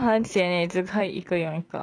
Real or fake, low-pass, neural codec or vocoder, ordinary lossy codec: fake; 9.9 kHz; vocoder, 44.1 kHz, 128 mel bands every 512 samples, BigVGAN v2; none